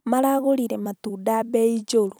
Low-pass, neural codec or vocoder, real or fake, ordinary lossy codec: none; vocoder, 44.1 kHz, 128 mel bands every 256 samples, BigVGAN v2; fake; none